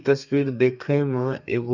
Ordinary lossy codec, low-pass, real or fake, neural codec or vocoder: none; 7.2 kHz; fake; codec, 32 kHz, 1.9 kbps, SNAC